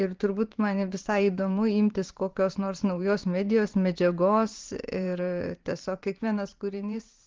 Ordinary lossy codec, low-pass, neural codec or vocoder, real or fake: Opus, 16 kbps; 7.2 kHz; vocoder, 44.1 kHz, 128 mel bands every 512 samples, BigVGAN v2; fake